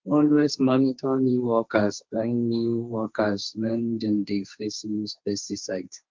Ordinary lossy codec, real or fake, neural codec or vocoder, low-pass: Opus, 24 kbps; fake; codec, 16 kHz, 1.1 kbps, Voila-Tokenizer; 7.2 kHz